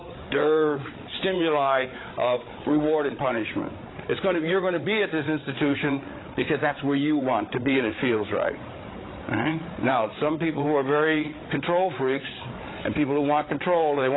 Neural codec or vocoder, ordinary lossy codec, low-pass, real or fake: codec, 16 kHz, 8 kbps, FreqCodec, larger model; AAC, 16 kbps; 7.2 kHz; fake